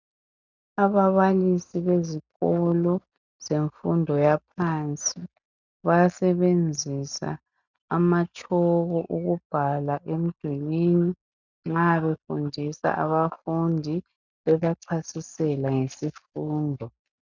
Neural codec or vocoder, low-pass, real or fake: none; 7.2 kHz; real